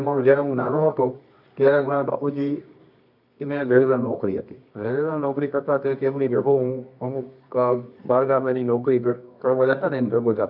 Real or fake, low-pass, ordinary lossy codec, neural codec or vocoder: fake; 5.4 kHz; none; codec, 24 kHz, 0.9 kbps, WavTokenizer, medium music audio release